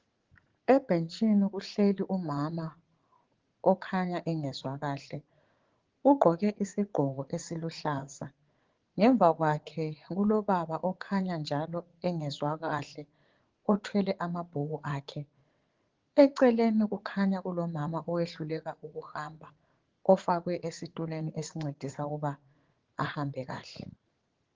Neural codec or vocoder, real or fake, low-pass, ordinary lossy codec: vocoder, 44.1 kHz, 80 mel bands, Vocos; fake; 7.2 kHz; Opus, 16 kbps